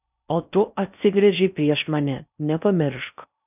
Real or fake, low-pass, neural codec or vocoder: fake; 3.6 kHz; codec, 16 kHz in and 24 kHz out, 0.6 kbps, FocalCodec, streaming, 4096 codes